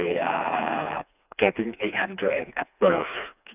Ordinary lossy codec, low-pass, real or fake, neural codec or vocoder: none; 3.6 kHz; fake; codec, 16 kHz, 2 kbps, FreqCodec, smaller model